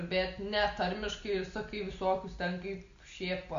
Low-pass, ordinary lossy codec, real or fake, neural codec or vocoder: 7.2 kHz; Opus, 64 kbps; real; none